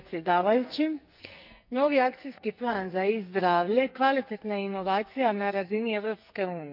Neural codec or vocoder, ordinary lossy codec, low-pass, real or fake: codec, 44.1 kHz, 2.6 kbps, SNAC; none; 5.4 kHz; fake